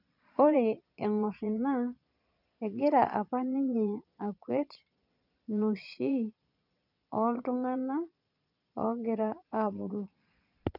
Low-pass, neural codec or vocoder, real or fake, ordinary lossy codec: 5.4 kHz; vocoder, 22.05 kHz, 80 mel bands, Vocos; fake; none